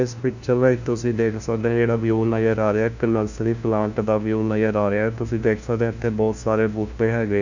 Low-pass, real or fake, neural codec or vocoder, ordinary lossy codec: 7.2 kHz; fake; codec, 16 kHz, 1 kbps, FunCodec, trained on LibriTTS, 50 frames a second; none